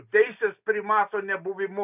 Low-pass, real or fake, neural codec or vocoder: 3.6 kHz; real; none